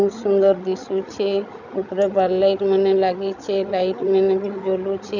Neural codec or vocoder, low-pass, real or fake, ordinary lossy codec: codec, 16 kHz, 16 kbps, FreqCodec, larger model; 7.2 kHz; fake; none